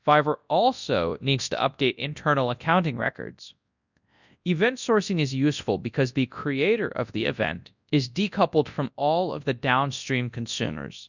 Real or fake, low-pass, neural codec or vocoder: fake; 7.2 kHz; codec, 24 kHz, 0.9 kbps, WavTokenizer, large speech release